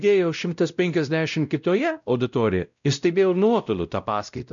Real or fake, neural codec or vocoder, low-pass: fake; codec, 16 kHz, 0.5 kbps, X-Codec, WavLM features, trained on Multilingual LibriSpeech; 7.2 kHz